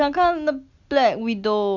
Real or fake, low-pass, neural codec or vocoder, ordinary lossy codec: real; 7.2 kHz; none; Opus, 64 kbps